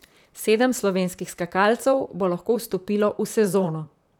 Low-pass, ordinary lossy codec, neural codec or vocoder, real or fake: 19.8 kHz; none; vocoder, 44.1 kHz, 128 mel bands, Pupu-Vocoder; fake